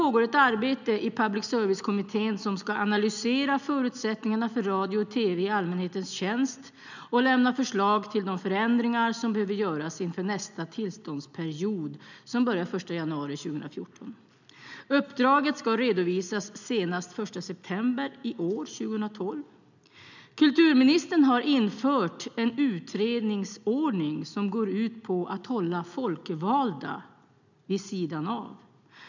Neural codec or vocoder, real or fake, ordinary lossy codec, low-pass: none; real; none; 7.2 kHz